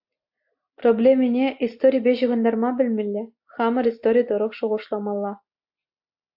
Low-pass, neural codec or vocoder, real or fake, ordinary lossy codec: 5.4 kHz; none; real; AAC, 48 kbps